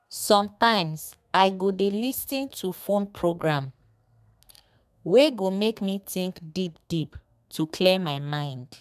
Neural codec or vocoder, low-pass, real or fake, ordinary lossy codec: codec, 32 kHz, 1.9 kbps, SNAC; 14.4 kHz; fake; none